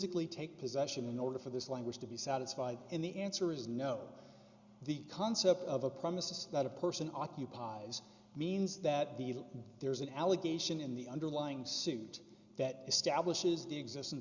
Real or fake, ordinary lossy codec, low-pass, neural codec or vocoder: real; Opus, 64 kbps; 7.2 kHz; none